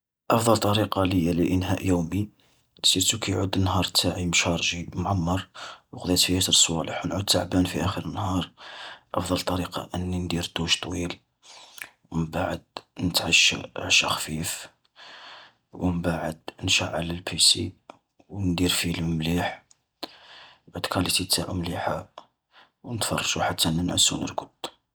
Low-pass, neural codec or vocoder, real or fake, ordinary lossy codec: none; none; real; none